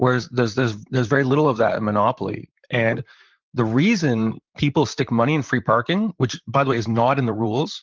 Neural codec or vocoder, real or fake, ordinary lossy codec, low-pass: vocoder, 44.1 kHz, 128 mel bands, Pupu-Vocoder; fake; Opus, 24 kbps; 7.2 kHz